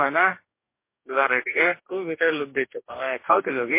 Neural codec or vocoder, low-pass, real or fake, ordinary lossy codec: codec, 44.1 kHz, 2.6 kbps, DAC; 3.6 kHz; fake; MP3, 24 kbps